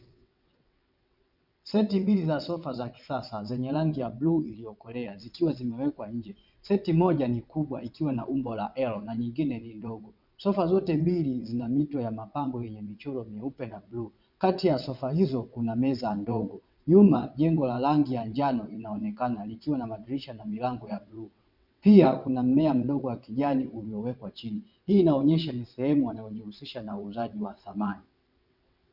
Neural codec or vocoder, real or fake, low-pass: vocoder, 22.05 kHz, 80 mel bands, WaveNeXt; fake; 5.4 kHz